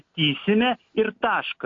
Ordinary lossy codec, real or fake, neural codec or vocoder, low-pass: AAC, 48 kbps; real; none; 7.2 kHz